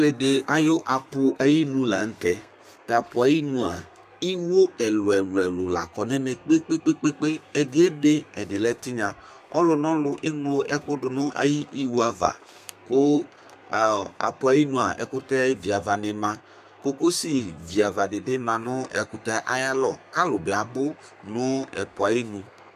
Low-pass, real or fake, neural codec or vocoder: 14.4 kHz; fake; codec, 32 kHz, 1.9 kbps, SNAC